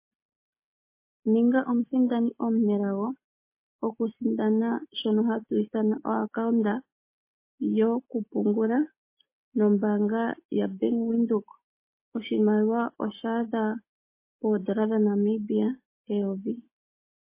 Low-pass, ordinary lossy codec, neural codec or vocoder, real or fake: 3.6 kHz; MP3, 24 kbps; none; real